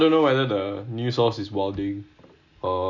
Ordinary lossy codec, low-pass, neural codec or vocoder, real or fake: none; 7.2 kHz; none; real